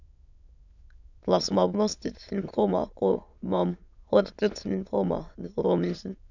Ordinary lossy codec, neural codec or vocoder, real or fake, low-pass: none; autoencoder, 22.05 kHz, a latent of 192 numbers a frame, VITS, trained on many speakers; fake; 7.2 kHz